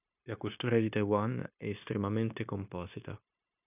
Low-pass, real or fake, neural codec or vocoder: 3.6 kHz; fake; codec, 16 kHz, 0.9 kbps, LongCat-Audio-Codec